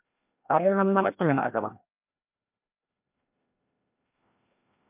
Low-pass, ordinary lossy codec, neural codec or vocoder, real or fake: 3.6 kHz; MP3, 32 kbps; codec, 16 kHz, 1 kbps, FreqCodec, larger model; fake